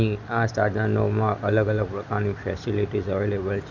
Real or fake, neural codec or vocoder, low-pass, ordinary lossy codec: real; none; 7.2 kHz; none